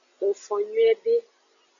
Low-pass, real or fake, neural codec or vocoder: 7.2 kHz; real; none